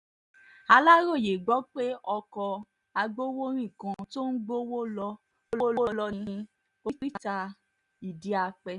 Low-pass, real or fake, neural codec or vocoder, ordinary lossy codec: 10.8 kHz; real; none; none